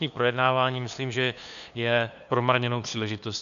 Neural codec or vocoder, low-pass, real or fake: codec, 16 kHz, 2 kbps, FunCodec, trained on LibriTTS, 25 frames a second; 7.2 kHz; fake